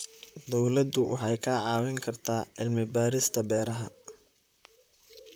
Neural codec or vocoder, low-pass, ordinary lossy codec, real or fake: vocoder, 44.1 kHz, 128 mel bands, Pupu-Vocoder; none; none; fake